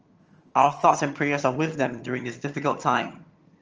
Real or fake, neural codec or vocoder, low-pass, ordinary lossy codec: fake; vocoder, 22.05 kHz, 80 mel bands, HiFi-GAN; 7.2 kHz; Opus, 24 kbps